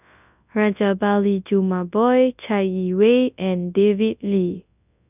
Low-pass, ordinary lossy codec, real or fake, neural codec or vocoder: 3.6 kHz; none; fake; codec, 24 kHz, 0.9 kbps, WavTokenizer, large speech release